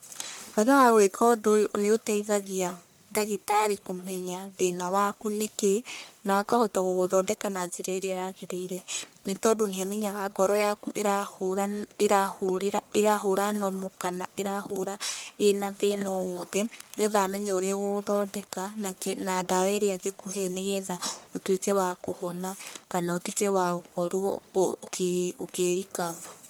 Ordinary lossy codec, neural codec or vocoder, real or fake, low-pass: none; codec, 44.1 kHz, 1.7 kbps, Pupu-Codec; fake; none